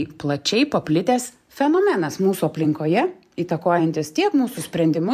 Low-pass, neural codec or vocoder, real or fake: 14.4 kHz; vocoder, 44.1 kHz, 128 mel bands every 512 samples, BigVGAN v2; fake